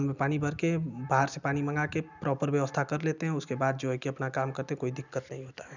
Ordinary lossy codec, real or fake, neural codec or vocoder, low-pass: none; real; none; 7.2 kHz